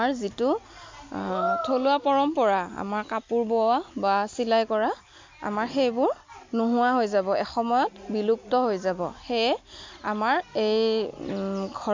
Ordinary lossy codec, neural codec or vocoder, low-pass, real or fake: MP3, 48 kbps; none; 7.2 kHz; real